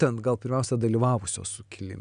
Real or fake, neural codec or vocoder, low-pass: real; none; 9.9 kHz